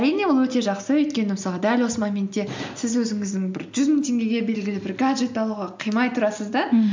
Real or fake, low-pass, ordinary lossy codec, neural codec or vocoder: real; 7.2 kHz; MP3, 64 kbps; none